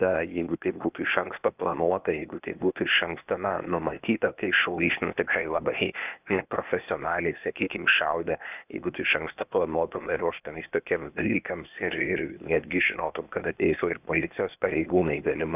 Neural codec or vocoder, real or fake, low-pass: codec, 16 kHz, 0.8 kbps, ZipCodec; fake; 3.6 kHz